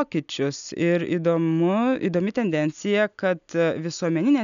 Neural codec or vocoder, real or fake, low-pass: none; real; 7.2 kHz